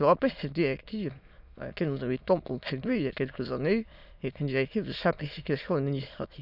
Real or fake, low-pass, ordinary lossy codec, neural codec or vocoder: fake; 5.4 kHz; none; autoencoder, 22.05 kHz, a latent of 192 numbers a frame, VITS, trained on many speakers